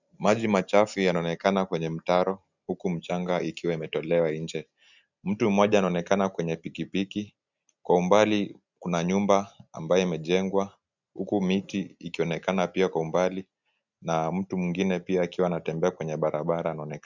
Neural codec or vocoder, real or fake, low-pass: none; real; 7.2 kHz